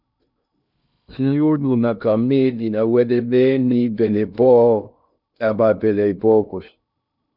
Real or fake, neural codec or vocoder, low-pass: fake; codec, 16 kHz in and 24 kHz out, 0.6 kbps, FocalCodec, streaming, 2048 codes; 5.4 kHz